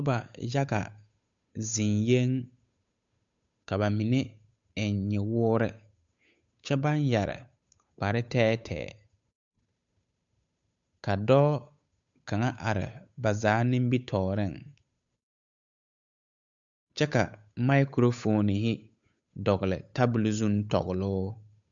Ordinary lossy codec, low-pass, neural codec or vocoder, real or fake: MP3, 64 kbps; 7.2 kHz; codec, 16 kHz, 8 kbps, FunCodec, trained on Chinese and English, 25 frames a second; fake